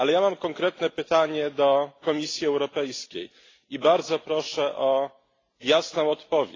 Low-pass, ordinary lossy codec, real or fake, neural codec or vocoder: 7.2 kHz; AAC, 32 kbps; real; none